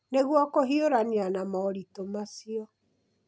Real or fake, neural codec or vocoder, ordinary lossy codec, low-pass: real; none; none; none